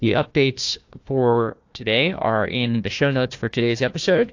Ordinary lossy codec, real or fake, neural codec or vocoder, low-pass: AAC, 48 kbps; fake; codec, 16 kHz, 1 kbps, FunCodec, trained on Chinese and English, 50 frames a second; 7.2 kHz